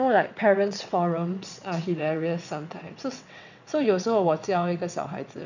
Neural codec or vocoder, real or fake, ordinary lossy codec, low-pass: vocoder, 22.05 kHz, 80 mel bands, WaveNeXt; fake; none; 7.2 kHz